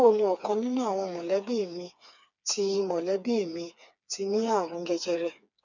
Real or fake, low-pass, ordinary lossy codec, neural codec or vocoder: fake; 7.2 kHz; none; codec, 16 kHz, 4 kbps, FreqCodec, smaller model